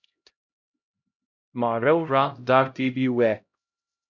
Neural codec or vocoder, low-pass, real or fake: codec, 16 kHz, 0.5 kbps, X-Codec, HuBERT features, trained on LibriSpeech; 7.2 kHz; fake